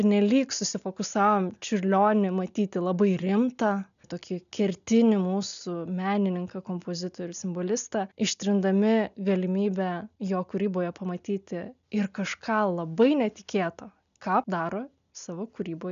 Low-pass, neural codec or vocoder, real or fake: 7.2 kHz; none; real